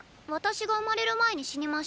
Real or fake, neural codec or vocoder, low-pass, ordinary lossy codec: real; none; none; none